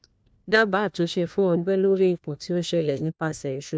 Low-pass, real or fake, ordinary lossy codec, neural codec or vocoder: none; fake; none; codec, 16 kHz, 1 kbps, FunCodec, trained on LibriTTS, 50 frames a second